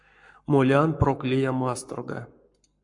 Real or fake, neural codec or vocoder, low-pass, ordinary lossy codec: fake; codec, 44.1 kHz, 7.8 kbps, Pupu-Codec; 10.8 kHz; MP3, 64 kbps